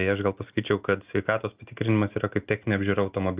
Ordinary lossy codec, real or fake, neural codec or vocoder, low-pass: Opus, 24 kbps; real; none; 3.6 kHz